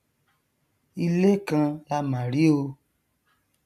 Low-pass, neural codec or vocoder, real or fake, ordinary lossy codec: 14.4 kHz; none; real; none